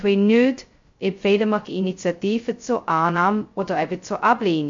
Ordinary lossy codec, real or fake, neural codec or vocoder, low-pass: MP3, 48 kbps; fake; codec, 16 kHz, 0.2 kbps, FocalCodec; 7.2 kHz